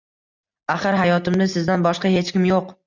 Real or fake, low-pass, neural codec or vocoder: real; 7.2 kHz; none